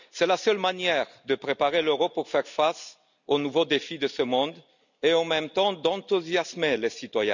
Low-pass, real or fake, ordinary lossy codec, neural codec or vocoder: 7.2 kHz; real; none; none